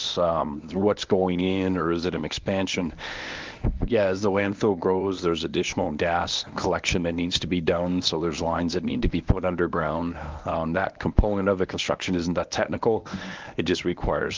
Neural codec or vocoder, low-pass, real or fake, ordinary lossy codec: codec, 24 kHz, 0.9 kbps, WavTokenizer, medium speech release version 1; 7.2 kHz; fake; Opus, 24 kbps